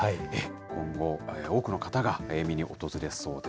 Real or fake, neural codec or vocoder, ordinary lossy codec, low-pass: real; none; none; none